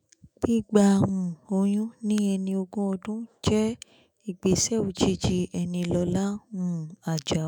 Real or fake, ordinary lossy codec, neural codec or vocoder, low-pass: fake; none; autoencoder, 48 kHz, 128 numbers a frame, DAC-VAE, trained on Japanese speech; none